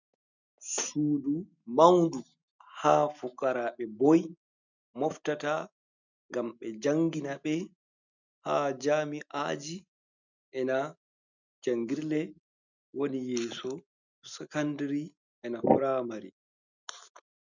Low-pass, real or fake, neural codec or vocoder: 7.2 kHz; real; none